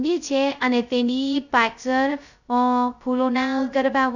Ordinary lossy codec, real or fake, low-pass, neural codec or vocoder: none; fake; 7.2 kHz; codec, 16 kHz, 0.2 kbps, FocalCodec